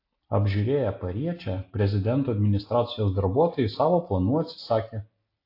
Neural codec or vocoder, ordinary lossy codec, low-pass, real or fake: none; AAC, 32 kbps; 5.4 kHz; real